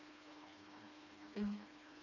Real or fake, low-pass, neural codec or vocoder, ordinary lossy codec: fake; 7.2 kHz; codec, 16 kHz, 2 kbps, FreqCodec, smaller model; none